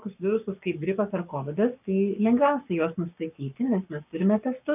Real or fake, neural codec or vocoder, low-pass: fake; codec, 44.1 kHz, 7.8 kbps, Pupu-Codec; 3.6 kHz